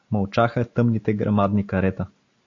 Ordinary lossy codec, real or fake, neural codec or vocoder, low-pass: AAC, 48 kbps; real; none; 7.2 kHz